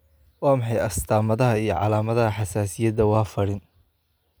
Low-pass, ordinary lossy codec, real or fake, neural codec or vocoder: none; none; real; none